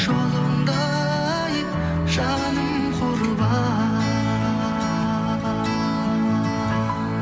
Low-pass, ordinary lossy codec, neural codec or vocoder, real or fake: none; none; none; real